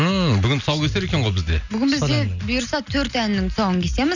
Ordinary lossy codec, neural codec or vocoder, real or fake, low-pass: none; none; real; 7.2 kHz